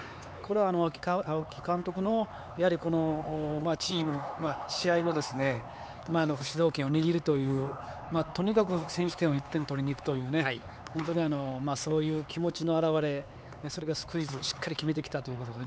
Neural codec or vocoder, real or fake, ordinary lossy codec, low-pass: codec, 16 kHz, 4 kbps, X-Codec, HuBERT features, trained on LibriSpeech; fake; none; none